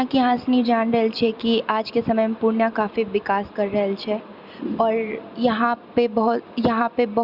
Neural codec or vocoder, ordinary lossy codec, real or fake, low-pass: none; Opus, 64 kbps; real; 5.4 kHz